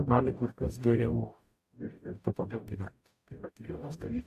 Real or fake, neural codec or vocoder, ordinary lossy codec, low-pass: fake; codec, 44.1 kHz, 0.9 kbps, DAC; AAC, 64 kbps; 14.4 kHz